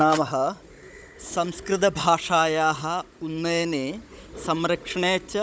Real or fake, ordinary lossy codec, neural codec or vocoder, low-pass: fake; none; codec, 16 kHz, 16 kbps, FunCodec, trained on Chinese and English, 50 frames a second; none